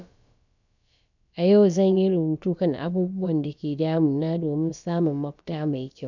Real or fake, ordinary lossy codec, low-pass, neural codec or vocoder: fake; none; 7.2 kHz; codec, 16 kHz, about 1 kbps, DyCAST, with the encoder's durations